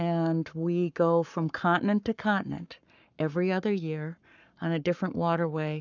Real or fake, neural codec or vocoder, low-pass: fake; codec, 44.1 kHz, 7.8 kbps, Pupu-Codec; 7.2 kHz